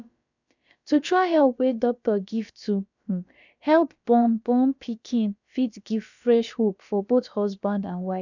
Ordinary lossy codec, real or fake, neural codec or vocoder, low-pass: none; fake; codec, 16 kHz, about 1 kbps, DyCAST, with the encoder's durations; 7.2 kHz